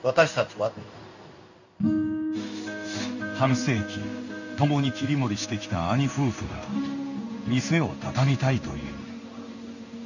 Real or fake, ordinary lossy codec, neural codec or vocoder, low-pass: fake; none; codec, 16 kHz in and 24 kHz out, 1 kbps, XY-Tokenizer; 7.2 kHz